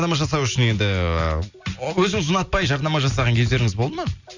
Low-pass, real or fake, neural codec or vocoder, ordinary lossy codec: 7.2 kHz; real; none; none